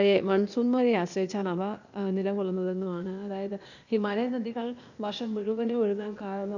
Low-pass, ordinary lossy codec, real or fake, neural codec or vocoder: 7.2 kHz; none; fake; codec, 16 kHz, 0.8 kbps, ZipCodec